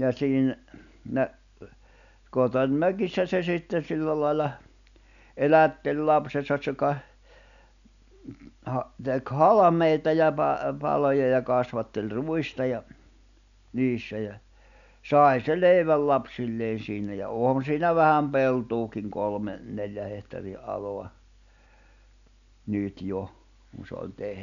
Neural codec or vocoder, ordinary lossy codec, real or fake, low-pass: none; none; real; 7.2 kHz